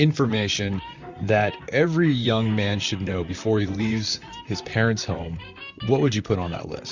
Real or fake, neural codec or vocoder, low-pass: fake; vocoder, 44.1 kHz, 128 mel bands, Pupu-Vocoder; 7.2 kHz